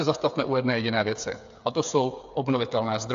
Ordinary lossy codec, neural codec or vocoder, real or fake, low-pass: AAC, 64 kbps; codec, 16 kHz, 8 kbps, FreqCodec, smaller model; fake; 7.2 kHz